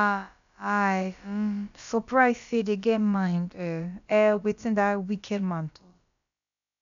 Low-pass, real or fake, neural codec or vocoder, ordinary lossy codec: 7.2 kHz; fake; codec, 16 kHz, about 1 kbps, DyCAST, with the encoder's durations; none